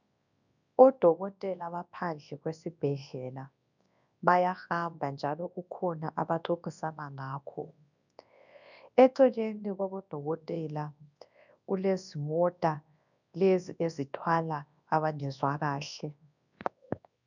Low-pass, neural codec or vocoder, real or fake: 7.2 kHz; codec, 24 kHz, 0.9 kbps, WavTokenizer, large speech release; fake